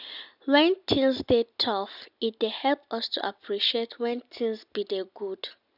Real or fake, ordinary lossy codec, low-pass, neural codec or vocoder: real; none; 5.4 kHz; none